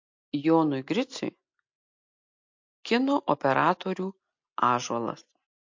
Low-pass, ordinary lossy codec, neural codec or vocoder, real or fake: 7.2 kHz; MP3, 48 kbps; none; real